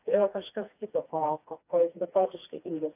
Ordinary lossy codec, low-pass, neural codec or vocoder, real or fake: AAC, 24 kbps; 3.6 kHz; codec, 16 kHz, 2 kbps, FreqCodec, smaller model; fake